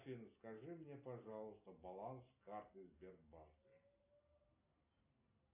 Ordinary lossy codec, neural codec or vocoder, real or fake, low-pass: AAC, 32 kbps; none; real; 3.6 kHz